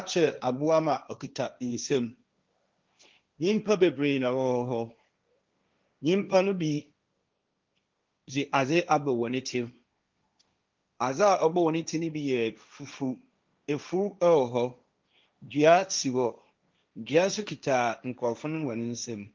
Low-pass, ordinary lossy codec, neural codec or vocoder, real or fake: 7.2 kHz; Opus, 24 kbps; codec, 16 kHz, 1.1 kbps, Voila-Tokenizer; fake